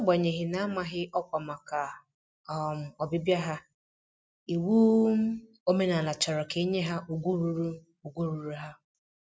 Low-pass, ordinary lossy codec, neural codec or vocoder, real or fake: none; none; none; real